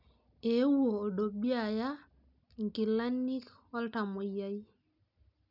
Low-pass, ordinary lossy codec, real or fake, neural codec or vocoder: 5.4 kHz; none; real; none